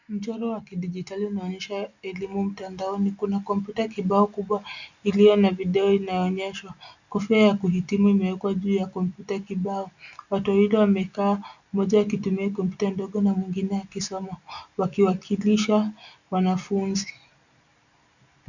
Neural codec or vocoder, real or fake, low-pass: none; real; 7.2 kHz